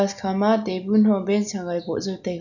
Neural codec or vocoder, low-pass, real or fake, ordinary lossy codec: none; 7.2 kHz; real; none